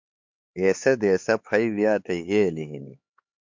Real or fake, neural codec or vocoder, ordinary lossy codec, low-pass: fake; codec, 16 kHz, 4 kbps, X-Codec, HuBERT features, trained on LibriSpeech; MP3, 48 kbps; 7.2 kHz